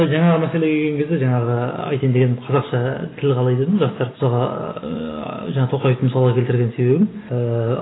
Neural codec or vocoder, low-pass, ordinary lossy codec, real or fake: none; 7.2 kHz; AAC, 16 kbps; real